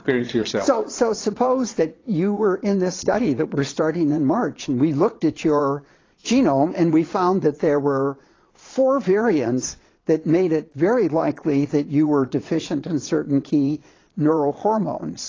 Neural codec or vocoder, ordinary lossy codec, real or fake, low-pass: vocoder, 22.05 kHz, 80 mel bands, Vocos; AAC, 32 kbps; fake; 7.2 kHz